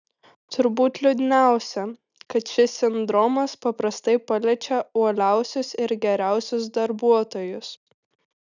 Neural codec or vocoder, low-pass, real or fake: none; 7.2 kHz; real